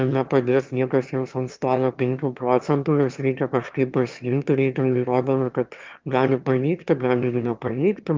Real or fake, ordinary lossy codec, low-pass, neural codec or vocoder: fake; Opus, 32 kbps; 7.2 kHz; autoencoder, 22.05 kHz, a latent of 192 numbers a frame, VITS, trained on one speaker